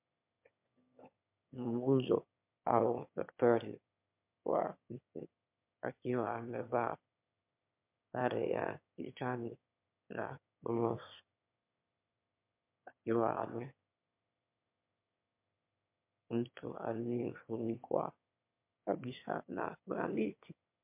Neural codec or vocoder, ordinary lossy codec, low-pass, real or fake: autoencoder, 22.05 kHz, a latent of 192 numbers a frame, VITS, trained on one speaker; MP3, 32 kbps; 3.6 kHz; fake